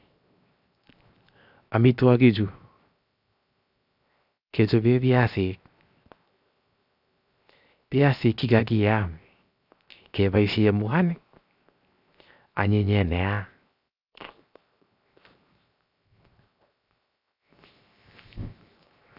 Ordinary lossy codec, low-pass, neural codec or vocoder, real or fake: none; 5.4 kHz; codec, 16 kHz, 0.7 kbps, FocalCodec; fake